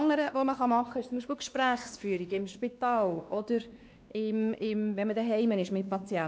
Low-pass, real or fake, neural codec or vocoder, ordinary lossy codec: none; fake; codec, 16 kHz, 2 kbps, X-Codec, WavLM features, trained on Multilingual LibriSpeech; none